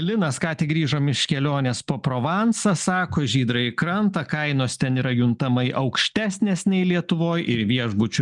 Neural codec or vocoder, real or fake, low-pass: none; real; 10.8 kHz